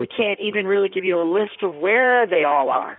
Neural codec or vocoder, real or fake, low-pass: codec, 16 kHz in and 24 kHz out, 1.1 kbps, FireRedTTS-2 codec; fake; 5.4 kHz